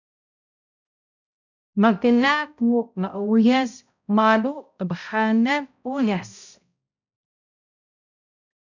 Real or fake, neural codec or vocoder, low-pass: fake; codec, 16 kHz, 0.5 kbps, X-Codec, HuBERT features, trained on balanced general audio; 7.2 kHz